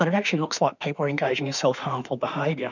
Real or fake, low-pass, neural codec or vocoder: fake; 7.2 kHz; autoencoder, 48 kHz, 32 numbers a frame, DAC-VAE, trained on Japanese speech